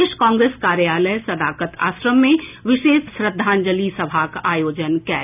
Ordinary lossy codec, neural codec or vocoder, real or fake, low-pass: none; none; real; 3.6 kHz